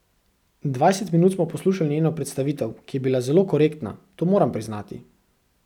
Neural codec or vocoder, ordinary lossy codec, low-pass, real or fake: none; none; 19.8 kHz; real